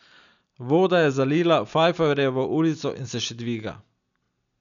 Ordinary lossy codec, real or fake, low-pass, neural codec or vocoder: none; real; 7.2 kHz; none